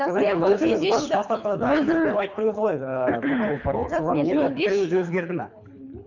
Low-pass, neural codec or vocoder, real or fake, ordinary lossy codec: 7.2 kHz; codec, 24 kHz, 3 kbps, HILCodec; fake; none